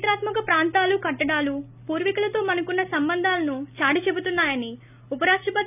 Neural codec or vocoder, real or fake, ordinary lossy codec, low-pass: none; real; none; 3.6 kHz